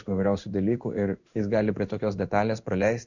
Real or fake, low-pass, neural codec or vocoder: fake; 7.2 kHz; codec, 16 kHz in and 24 kHz out, 1 kbps, XY-Tokenizer